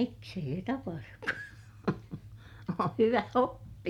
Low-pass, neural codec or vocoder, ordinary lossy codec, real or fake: 19.8 kHz; none; MP3, 96 kbps; real